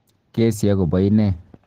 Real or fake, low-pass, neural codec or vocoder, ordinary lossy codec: real; 14.4 kHz; none; Opus, 16 kbps